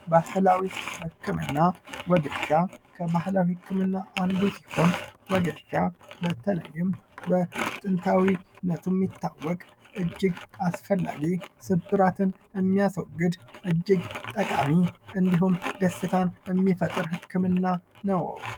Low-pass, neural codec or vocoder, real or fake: 19.8 kHz; codec, 44.1 kHz, 7.8 kbps, DAC; fake